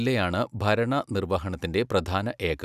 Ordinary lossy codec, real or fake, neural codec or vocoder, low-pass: none; real; none; 14.4 kHz